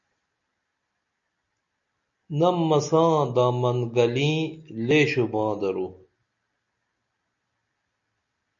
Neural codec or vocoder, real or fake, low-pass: none; real; 7.2 kHz